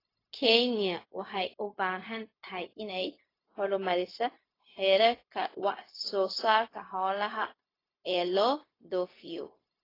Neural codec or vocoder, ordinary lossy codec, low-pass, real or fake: codec, 16 kHz, 0.4 kbps, LongCat-Audio-Codec; AAC, 24 kbps; 5.4 kHz; fake